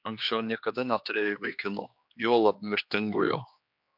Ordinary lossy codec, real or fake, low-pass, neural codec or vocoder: MP3, 48 kbps; fake; 5.4 kHz; codec, 16 kHz, 2 kbps, X-Codec, HuBERT features, trained on general audio